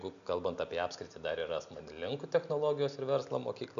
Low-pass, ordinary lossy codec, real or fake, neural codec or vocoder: 7.2 kHz; MP3, 96 kbps; real; none